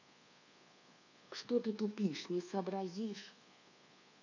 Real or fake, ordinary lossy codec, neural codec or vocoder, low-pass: fake; none; codec, 24 kHz, 1.2 kbps, DualCodec; 7.2 kHz